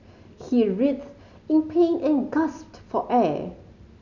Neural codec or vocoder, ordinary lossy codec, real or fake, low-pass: none; none; real; 7.2 kHz